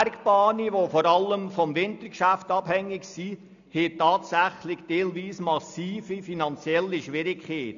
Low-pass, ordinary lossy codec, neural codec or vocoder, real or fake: 7.2 kHz; none; none; real